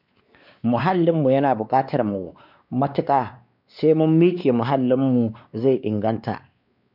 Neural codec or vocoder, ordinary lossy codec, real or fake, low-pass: codec, 16 kHz, 2 kbps, X-Codec, WavLM features, trained on Multilingual LibriSpeech; none; fake; 5.4 kHz